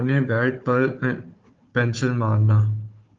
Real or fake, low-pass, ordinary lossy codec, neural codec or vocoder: fake; 7.2 kHz; Opus, 32 kbps; codec, 16 kHz, 4 kbps, FunCodec, trained on Chinese and English, 50 frames a second